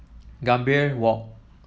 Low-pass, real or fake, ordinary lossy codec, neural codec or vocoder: none; real; none; none